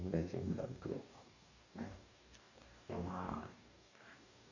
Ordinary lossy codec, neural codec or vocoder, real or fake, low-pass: none; codec, 44.1 kHz, 2.6 kbps, DAC; fake; 7.2 kHz